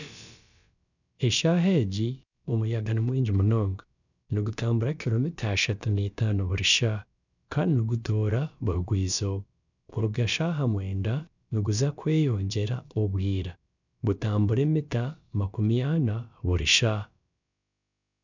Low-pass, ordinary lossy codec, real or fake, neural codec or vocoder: 7.2 kHz; none; fake; codec, 16 kHz, about 1 kbps, DyCAST, with the encoder's durations